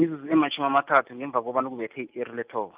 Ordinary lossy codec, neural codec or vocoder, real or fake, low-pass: Opus, 32 kbps; none; real; 3.6 kHz